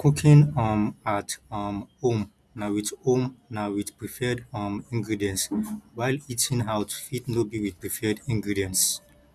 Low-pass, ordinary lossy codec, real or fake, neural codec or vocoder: none; none; real; none